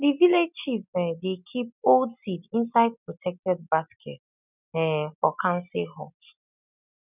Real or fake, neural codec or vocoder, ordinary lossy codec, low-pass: real; none; none; 3.6 kHz